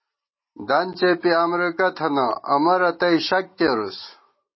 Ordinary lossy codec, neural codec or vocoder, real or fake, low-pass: MP3, 24 kbps; none; real; 7.2 kHz